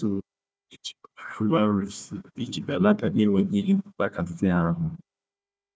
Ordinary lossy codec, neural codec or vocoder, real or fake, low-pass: none; codec, 16 kHz, 1 kbps, FunCodec, trained on Chinese and English, 50 frames a second; fake; none